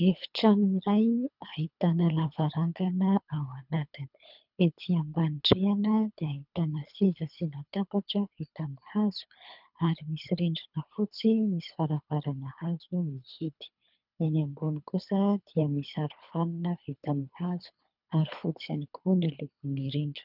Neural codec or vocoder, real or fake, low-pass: codec, 24 kHz, 3 kbps, HILCodec; fake; 5.4 kHz